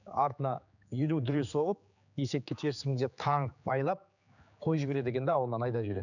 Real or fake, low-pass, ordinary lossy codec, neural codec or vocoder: fake; 7.2 kHz; none; codec, 16 kHz, 4 kbps, X-Codec, HuBERT features, trained on general audio